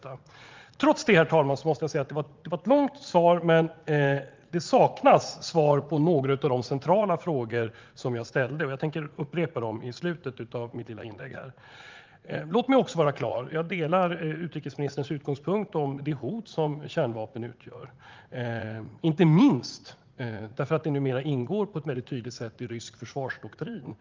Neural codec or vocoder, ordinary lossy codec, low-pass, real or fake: vocoder, 44.1 kHz, 80 mel bands, Vocos; Opus, 32 kbps; 7.2 kHz; fake